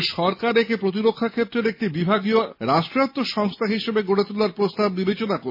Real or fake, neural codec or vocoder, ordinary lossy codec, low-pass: real; none; MP3, 24 kbps; 5.4 kHz